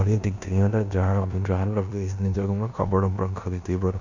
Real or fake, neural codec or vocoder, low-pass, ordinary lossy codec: fake; codec, 16 kHz in and 24 kHz out, 0.9 kbps, LongCat-Audio-Codec, four codebook decoder; 7.2 kHz; none